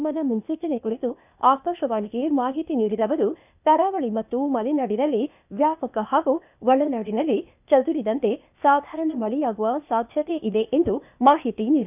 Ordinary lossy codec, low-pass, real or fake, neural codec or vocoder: none; 3.6 kHz; fake; codec, 16 kHz, 0.8 kbps, ZipCodec